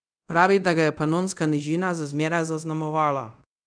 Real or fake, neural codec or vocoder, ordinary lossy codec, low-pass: fake; codec, 24 kHz, 0.5 kbps, DualCodec; none; 9.9 kHz